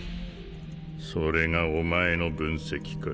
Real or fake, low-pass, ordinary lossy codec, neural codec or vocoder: real; none; none; none